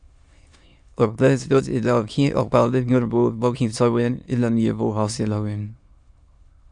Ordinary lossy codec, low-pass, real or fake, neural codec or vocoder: Opus, 64 kbps; 9.9 kHz; fake; autoencoder, 22.05 kHz, a latent of 192 numbers a frame, VITS, trained on many speakers